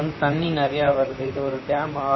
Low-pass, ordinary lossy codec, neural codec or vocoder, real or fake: 7.2 kHz; MP3, 24 kbps; vocoder, 44.1 kHz, 128 mel bands, Pupu-Vocoder; fake